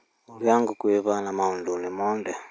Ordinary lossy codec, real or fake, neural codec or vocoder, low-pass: none; real; none; none